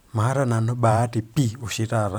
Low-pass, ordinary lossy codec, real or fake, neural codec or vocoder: none; none; fake; vocoder, 44.1 kHz, 128 mel bands every 512 samples, BigVGAN v2